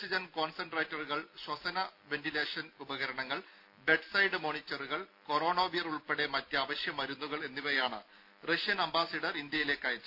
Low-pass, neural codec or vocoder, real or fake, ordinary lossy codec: 5.4 kHz; none; real; none